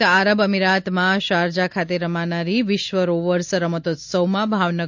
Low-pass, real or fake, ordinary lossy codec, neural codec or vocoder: 7.2 kHz; real; MP3, 64 kbps; none